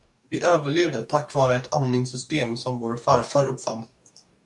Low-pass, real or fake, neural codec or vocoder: 10.8 kHz; fake; codec, 44.1 kHz, 2.6 kbps, DAC